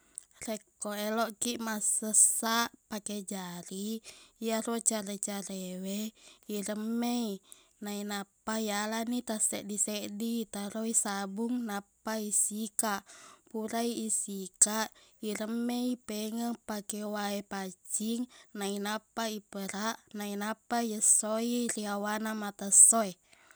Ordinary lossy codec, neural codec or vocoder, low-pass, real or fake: none; none; none; real